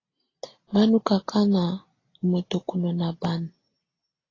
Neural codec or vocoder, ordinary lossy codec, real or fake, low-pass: none; AAC, 32 kbps; real; 7.2 kHz